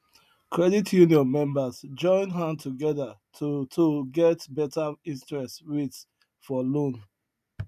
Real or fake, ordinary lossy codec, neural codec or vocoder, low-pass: real; none; none; 14.4 kHz